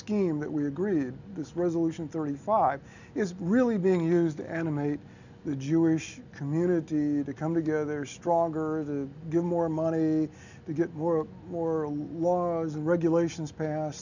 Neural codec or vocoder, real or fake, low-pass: none; real; 7.2 kHz